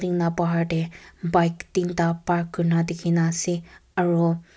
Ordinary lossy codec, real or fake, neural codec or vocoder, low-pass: none; real; none; none